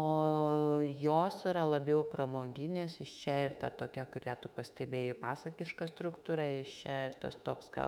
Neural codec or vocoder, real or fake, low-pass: autoencoder, 48 kHz, 32 numbers a frame, DAC-VAE, trained on Japanese speech; fake; 19.8 kHz